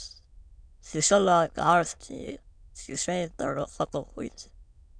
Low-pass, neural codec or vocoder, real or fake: 9.9 kHz; autoencoder, 22.05 kHz, a latent of 192 numbers a frame, VITS, trained on many speakers; fake